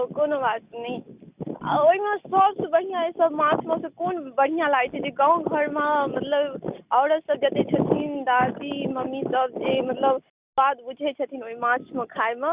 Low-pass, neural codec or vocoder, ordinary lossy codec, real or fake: 3.6 kHz; none; Opus, 64 kbps; real